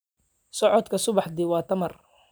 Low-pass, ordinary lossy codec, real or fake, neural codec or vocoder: none; none; real; none